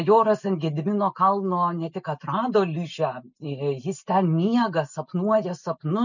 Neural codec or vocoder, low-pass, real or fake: none; 7.2 kHz; real